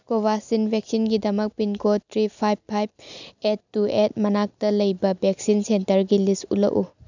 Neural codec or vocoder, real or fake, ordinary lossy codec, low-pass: none; real; none; 7.2 kHz